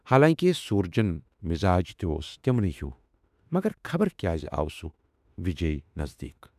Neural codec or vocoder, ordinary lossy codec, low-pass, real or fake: autoencoder, 48 kHz, 32 numbers a frame, DAC-VAE, trained on Japanese speech; none; 14.4 kHz; fake